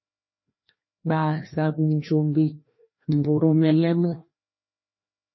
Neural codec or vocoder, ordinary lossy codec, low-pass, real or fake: codec, 16 kHz, 1 kbps, FreqCodec, larger model; MP3, 24 kbps; 7.2 kHz; fake